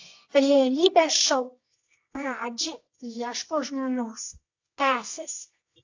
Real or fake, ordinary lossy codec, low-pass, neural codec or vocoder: fake; AAC, 48 kbps; 7.2 kHz; codec, 24 kHz, 0.9 kbps, WavTokenizer, medium music audio release